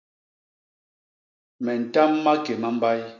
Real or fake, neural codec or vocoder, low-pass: real; none; 7.2 kHz